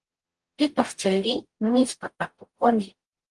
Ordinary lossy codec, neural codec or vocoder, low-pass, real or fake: Opus, 24 kbps; codec, 44.1 kHz, 0.9 kbps, DAC; 10.8 kHz; fake